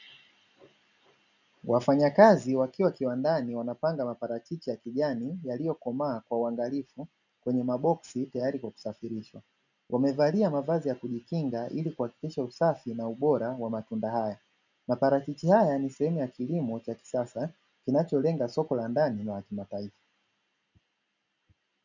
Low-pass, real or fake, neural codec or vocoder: 7.2 kHz; real; none